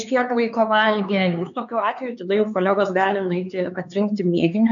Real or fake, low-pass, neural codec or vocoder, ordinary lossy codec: fake; 7.2 kHz; codec, 16 kHz, 4 kbps, X-Codec, HuBERT features, trained on LibriSpeech; MP3, 96 kbps